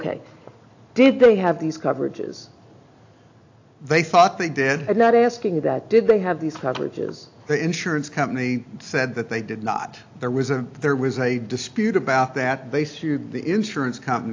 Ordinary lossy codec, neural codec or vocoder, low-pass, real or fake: AAC, 48 kbps; none; 7.2 kHz; real